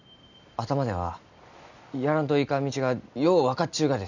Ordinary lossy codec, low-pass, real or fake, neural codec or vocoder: none; 7.2 kHz; real; none